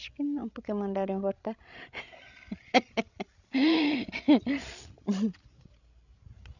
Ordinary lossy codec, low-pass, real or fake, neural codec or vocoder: none; 7.2 kHz; fake; codec, 16 kHz, 16 kbps, FreqCodec, larger model